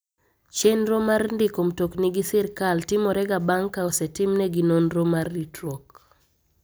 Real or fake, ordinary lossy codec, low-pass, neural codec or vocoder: real; none; none; none